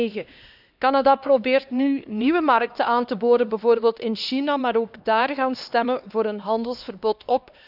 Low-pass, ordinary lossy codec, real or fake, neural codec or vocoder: 5.4 kHz; none; fake; codec, 16 kHz, 2 kbps, X-Codec, HuBERT features, trained on LibriSpeech